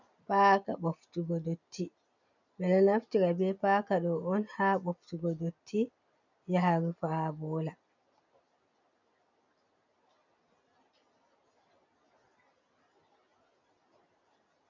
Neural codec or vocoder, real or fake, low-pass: none; real; 7.2 kHz